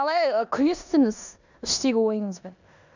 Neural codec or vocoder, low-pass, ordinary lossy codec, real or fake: codec, 16 kHz in and 24 kHz out, 0.9 kbps, LongCat-Audio-Codec, four codebook decoder; 7.2 kHz; none; fake